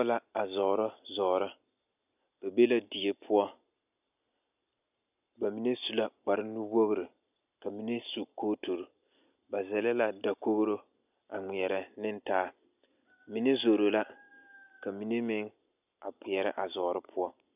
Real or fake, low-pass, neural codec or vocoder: real; 3.6 kHz; none